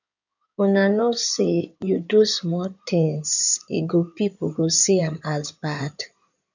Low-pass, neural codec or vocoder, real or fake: 7.2 kHz; codec, 16 kHz in and 24 kHz out, 2.2 kbps, FireRedTTS-2 codec; fake